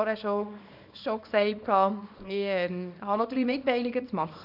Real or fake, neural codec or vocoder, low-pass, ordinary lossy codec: fake; codec, 24 kHz, 0.9 kbps, WavTokenizer, small release; 5.4 kHz; none